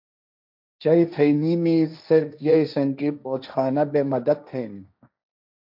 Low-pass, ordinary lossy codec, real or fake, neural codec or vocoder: 5.4 kHz; MP3, 48 kbps; fake; codec, 16 kHz, 1.1 kbps, Voila-Tokenizer